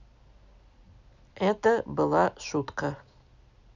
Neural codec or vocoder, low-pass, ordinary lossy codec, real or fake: none; 7.2 kHz; none; real